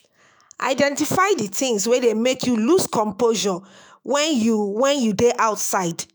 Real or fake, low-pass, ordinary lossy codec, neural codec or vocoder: fake; none; none; autoencoder, 48 kHz, 128 numbers a frame, DAC-VAE, trained on Japanese speech